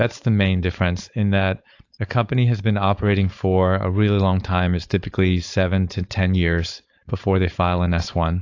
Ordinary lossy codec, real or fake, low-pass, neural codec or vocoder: AAC, 48 kbps; fake; 7.2 kHz; codec, 16 kHz, 4.8 kbps, FACodec